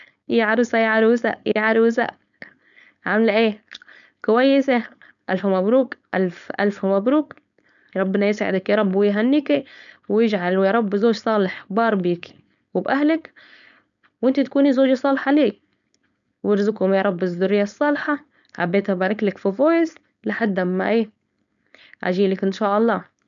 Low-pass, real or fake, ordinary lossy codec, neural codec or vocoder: 7.2 kHz; fake; none; codec, 16 kHz, 4.8 kbps, FACodec